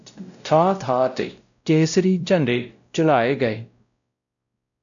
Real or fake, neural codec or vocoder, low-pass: fake; codec, 16 kHz, 0.5 kbps, X-Codec, WavLM features, trained on Multilingual LibriSpeech; 7.2 kHz